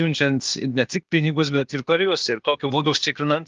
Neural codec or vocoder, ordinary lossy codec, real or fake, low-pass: codec, 16 kHz, 0.8 kbps, ZipCodec; Opus, 32 kbps; fake; 7.2 kHz